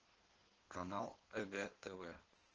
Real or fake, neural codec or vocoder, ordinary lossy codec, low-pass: fake; codec, 16 kHz in and 24 kHz out, 1.1 kbps, FireRedTTS-2 codec; Opus, 16 kbps; 7.2 kHz